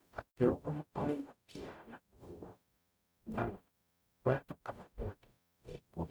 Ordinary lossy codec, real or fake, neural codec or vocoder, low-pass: none; fake; codec, 44.1 kHz, 0.9 kbps, DAC; none